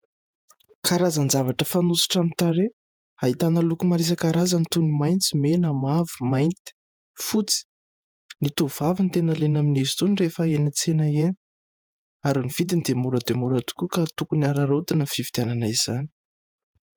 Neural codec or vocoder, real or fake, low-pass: vocoder, 48 kHz, 128 mel bands, Vocos; fake; 19.8 kHz